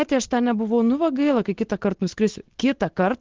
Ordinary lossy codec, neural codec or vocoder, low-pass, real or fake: Opus, 16 kbps; none; 7.2 kHz; real